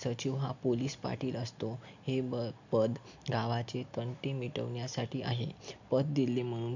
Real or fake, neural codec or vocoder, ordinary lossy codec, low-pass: real; none; none; 7.2 kHz